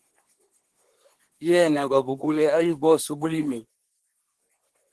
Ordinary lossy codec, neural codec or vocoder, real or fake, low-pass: Opus, 16 kbps; codec, 24 kHz, 1 kbps, SNAC; fake; 10.8 kHz